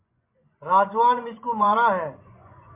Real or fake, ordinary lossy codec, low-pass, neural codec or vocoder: fake; MP3, 24 kbps; 3.6 kHz; vocoder, 24 kHz, 100 mel bands, Vocos